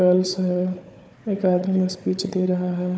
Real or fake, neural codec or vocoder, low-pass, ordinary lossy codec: fake; codec, 16 kHz, 4 kbps, FunCodec, trained on Chinese and English, 50 frames a second; none; none